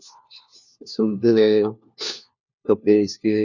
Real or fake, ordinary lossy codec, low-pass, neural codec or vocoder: fake; none; 7.2 kHz; codec, 16 kHz, 1 kbps, FunCodec, trained on LibriTTS, 50 frames a second